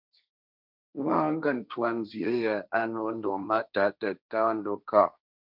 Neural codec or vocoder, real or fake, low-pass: codec, 16 kHz, 1.1 kbps, Voila-Tokenizer; fake; 5.4 kHz